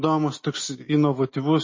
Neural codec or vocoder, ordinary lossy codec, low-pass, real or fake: none; MP3, 32 kbps; 7.2 kHz; real